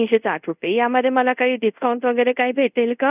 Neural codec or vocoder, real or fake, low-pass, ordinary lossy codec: codec, 24 kHz, 0.5 kbps, DualCodec; fake; 3.6 kHz; none